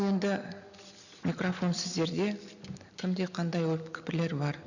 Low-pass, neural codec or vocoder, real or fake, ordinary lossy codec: 7.2 kHz; none; real; none